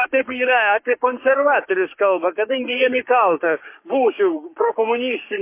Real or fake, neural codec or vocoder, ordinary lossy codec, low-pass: fake; codec, 44.1 kHz, 3.4 kbps, Pupu-Codec; MP3, 24 kbps; 3.6 kHz